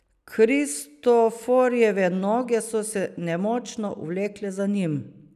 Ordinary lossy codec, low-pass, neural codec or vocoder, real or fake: none; 14.4 kHz; none; real